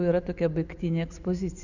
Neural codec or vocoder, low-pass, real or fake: none; 7.2 kHz; real